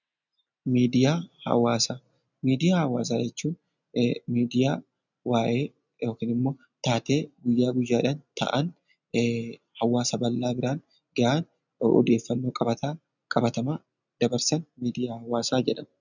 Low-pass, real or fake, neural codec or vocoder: 7.2 kHz; real; none